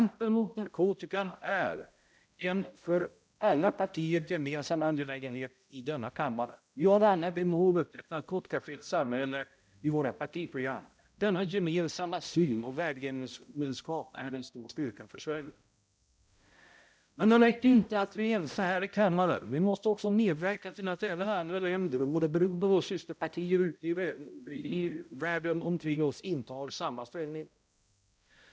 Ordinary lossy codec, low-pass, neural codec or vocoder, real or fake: none; none; codec, 16 kHz, 0.5 kbps, X-Codec, HuBERT features, trained on balanced general audio; fake